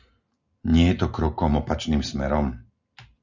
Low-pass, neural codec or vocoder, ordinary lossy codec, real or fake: 7.2 kHz; none; Opus, 64 kbps; real